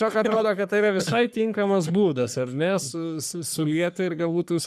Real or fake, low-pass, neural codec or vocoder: fake; 14.4 kHz; codec, 44.1 kHz, 3.4 kbps, Pupu-Codec